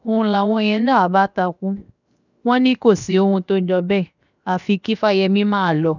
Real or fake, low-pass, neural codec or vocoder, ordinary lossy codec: fake; 7.2 kHz; codec, 16 kHz, 0.7 kbps, FocalCodec; none